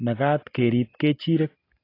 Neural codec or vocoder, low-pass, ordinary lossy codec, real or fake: none; 5.4 kHz; AAC, 24 kbps; real